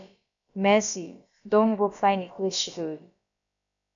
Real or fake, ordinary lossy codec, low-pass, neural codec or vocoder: fake; MP3, 96 kbps; 7.2 kHz; codec, 16 kHz, about 1 kbps, DyCAST, with the encoder's durations